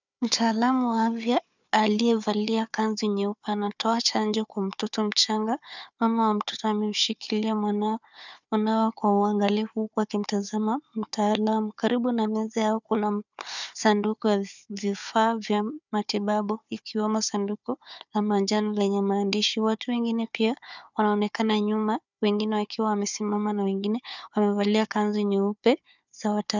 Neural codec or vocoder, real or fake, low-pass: codec, 16 kHz, 4 kbps, FunCodec, trained on Chinese and English, 50 frames a second; fake; 7.2 kHz